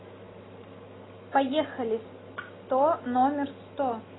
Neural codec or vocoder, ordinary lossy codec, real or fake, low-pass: none; AAC, 16 kbps; real; 7.2 kHz